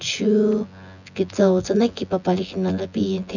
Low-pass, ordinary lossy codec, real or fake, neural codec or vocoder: 7.2 kHz; none; fake; vocoder, 24 kHz, 100 mel bands, Vocos